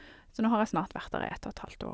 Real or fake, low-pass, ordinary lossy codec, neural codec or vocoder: real; none; none; none